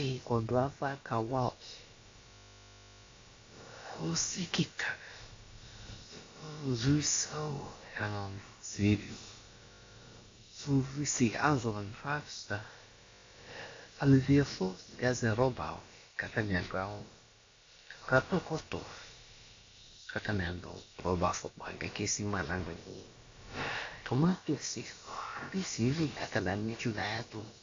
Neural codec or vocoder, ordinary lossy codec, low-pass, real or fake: codec, 16 kHz, about 1 kbps, DyCAST, with the encoder's durations; AAC, 48 kbps; 7.2 kHz; fake